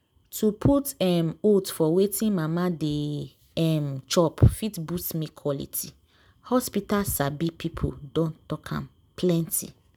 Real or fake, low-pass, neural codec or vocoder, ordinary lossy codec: real; none; none; none